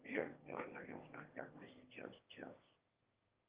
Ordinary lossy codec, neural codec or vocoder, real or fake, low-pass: Opus, 24 kbps; autoencoder, 22.05 kHz, a latent of 192 numbers a frame, VITS, trained on one speaker; fake; 3.6 kHz